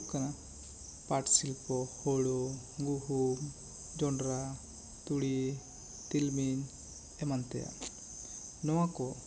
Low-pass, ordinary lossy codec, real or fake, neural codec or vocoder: none; none; real; none